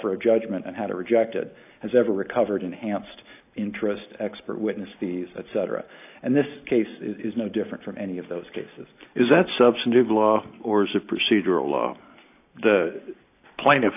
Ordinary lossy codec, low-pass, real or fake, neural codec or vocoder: AAC, 32 kbps; 3.6 kHz; real; none